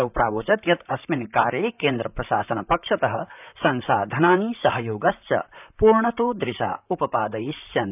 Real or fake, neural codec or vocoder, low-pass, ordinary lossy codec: fake; vocoder, 44.1 kHz, 128 mel bands, Pupu-Vocoder; 3.6 kHz; none